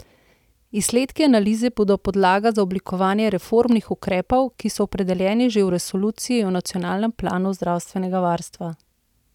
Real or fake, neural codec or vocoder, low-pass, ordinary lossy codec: real; none; 19.8 kHz; none